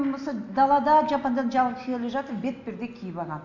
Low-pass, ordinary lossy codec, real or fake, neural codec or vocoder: 7.2 kHz; none; real; none